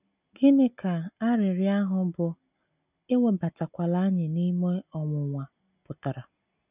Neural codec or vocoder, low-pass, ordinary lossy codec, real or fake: none; 3.6 kHz; none; real